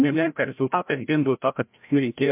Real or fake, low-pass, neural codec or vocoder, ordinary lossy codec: fake; 3.6 kHz; codec, 16 kHz, 0.5 kbps, FreqCodec, larger model; MP3, 32 kbps